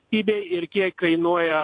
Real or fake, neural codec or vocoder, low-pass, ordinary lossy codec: fake; vocoder, 22.05 kHz, 80 mel bands, WaveNeXt; 9.9 kHz; AAC, 48 kbps